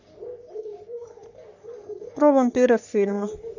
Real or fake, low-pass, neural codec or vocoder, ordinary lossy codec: fake; 7.2 kHz; codec, 44.1 kHz, 3.4 kbps, Pupu-Codec; none